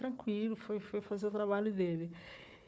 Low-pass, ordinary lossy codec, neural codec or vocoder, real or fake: none; none; codec, 16 kHz, 4 kbps, FunCodec, trained on Chinese and English, 50 frames a second; fake